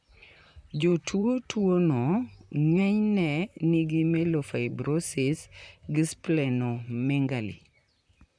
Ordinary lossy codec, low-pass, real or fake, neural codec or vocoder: none; 9.9 kHz; fake; vocoder, 44.1 kHz, 128 mel bands, Pupu-Vocoder